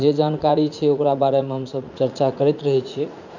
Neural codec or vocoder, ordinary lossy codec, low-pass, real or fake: vocoder, 44.1 kHz, 80 mel bands, Vocos; none; 7.2 kHz; fake